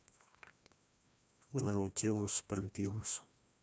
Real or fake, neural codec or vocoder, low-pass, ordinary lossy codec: fake; codec, 16 kHz, 1 kbps, FreqCodec, larger model; none; none